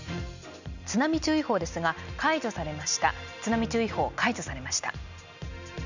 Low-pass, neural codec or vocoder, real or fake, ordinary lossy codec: 7.2 kHz; none; real; none